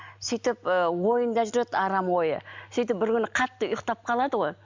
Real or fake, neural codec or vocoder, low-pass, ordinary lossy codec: real; none; 7.2 kHz; MP3, 64 kbps